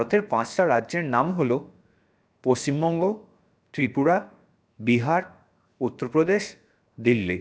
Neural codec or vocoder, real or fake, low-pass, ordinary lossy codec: codec, 16 kHz, 0.7 kbps, FocalCodec; fake; none; none